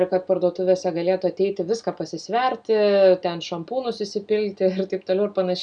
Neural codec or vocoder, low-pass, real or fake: none; 9.9 kHz; real